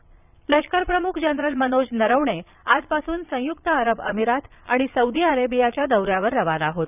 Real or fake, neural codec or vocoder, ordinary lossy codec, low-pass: fake; vocoder, 22.05 kHz, 80 mel bands, Vocos; none; 3.6 kHz